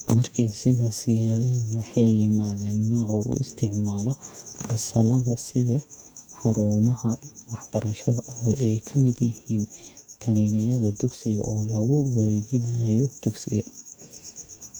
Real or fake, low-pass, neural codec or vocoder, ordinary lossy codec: fake; none; codec, 44.1 kHz, 2.6 kbps, DAC; none